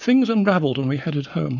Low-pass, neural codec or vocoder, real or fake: 7.2 kHz; codec, 44.1 kHz, 7.8 kbps, Pupu-Codec; fake